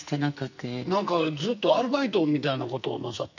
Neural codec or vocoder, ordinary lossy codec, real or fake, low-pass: codec, 44.1 kHz, 2.6 kbps, SNAC; none; fake; 7.2 kHz